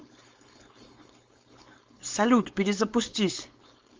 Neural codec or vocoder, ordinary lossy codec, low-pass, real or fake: codec, 16 kHz, 4.8 kbps, FACodec; Opus, 32 kbps; 7.2 kHz; fake